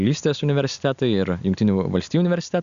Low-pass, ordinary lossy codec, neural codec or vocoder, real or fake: 7.2 kHz; Opus, 64 kbps; none; real